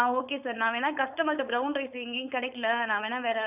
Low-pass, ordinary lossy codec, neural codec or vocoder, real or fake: 3.6 kHz; none; codec, 16 kHz, 4.8 kbps, FACodec; fake